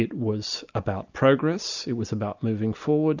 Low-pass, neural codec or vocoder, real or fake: 7.2 kHz; none; real